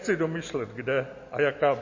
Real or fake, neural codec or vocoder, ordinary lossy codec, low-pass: real; none; MP3, 32 kbps; 7.2 kHz